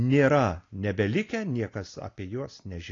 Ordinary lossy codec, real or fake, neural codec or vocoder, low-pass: AAC, 32 kbps; real; none; 7.2 kHz